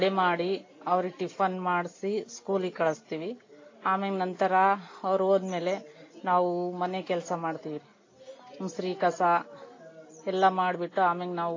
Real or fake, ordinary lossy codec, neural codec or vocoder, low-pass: real; AAC, 32 kbps; none; 7.2 kHz